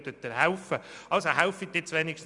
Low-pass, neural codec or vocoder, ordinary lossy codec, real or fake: 10.8 kHz; none; none; real